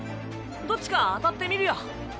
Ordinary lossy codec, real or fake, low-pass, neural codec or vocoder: none; real; none; none